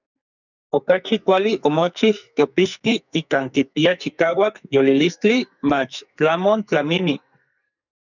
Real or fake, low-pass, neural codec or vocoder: fake; 7.2 kHz; codec, 44.1 kHz, 2.6 kbps, SNAC